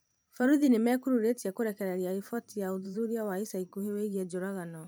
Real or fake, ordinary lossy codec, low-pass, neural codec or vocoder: fake; none; none; vocoder, 44.1 kHz, 128 mel bands every 512 samples, BigVGAN v2